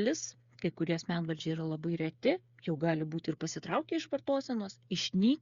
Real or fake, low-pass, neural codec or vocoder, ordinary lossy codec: fake; 7.2 kHz; codec, 16 kHz, 8 kbps, FreqCodec, smaller model; Opus, 64 kbps